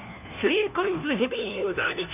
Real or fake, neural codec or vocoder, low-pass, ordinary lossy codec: fake; codec, 16 kHz, 1 kbps, FunCodec, trained on LibriTTS, 50 frames a second; 3.6 kHz; none